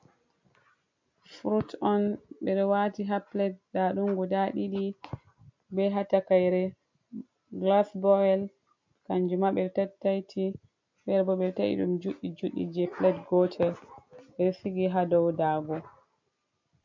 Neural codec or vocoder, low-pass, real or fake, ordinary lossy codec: none; 7.2 kHz; real; MP3, 48 kbps